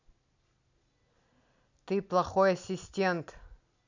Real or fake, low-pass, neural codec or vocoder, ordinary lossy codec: real; 7.2 kHz; none; none